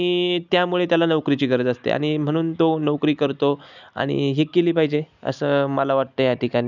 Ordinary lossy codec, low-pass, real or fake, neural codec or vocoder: none; 7.2 kHz; real; none